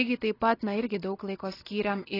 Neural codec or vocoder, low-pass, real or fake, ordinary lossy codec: none; 5.4 kHz; real; AAC, 24 kbps